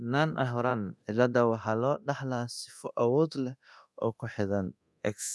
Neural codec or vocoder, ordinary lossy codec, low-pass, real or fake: codec, 24 kHz, 0.9 kbps, DualCodec; none; none; fake